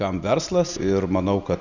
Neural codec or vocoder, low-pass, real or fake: none; 7.2 kHz; real